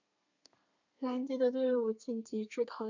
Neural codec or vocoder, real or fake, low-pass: codec, 32 kHz, 1.9 kbps, SNAC; fake; 7.2 kHz